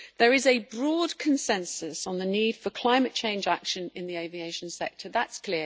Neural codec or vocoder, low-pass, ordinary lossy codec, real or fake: none; none; none; real